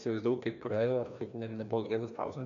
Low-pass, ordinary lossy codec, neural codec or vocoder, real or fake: 7.2 kHz; MP3, 64 kbps; codec, 16 kHz, 1 kbps, FreqCodec, larger model; fake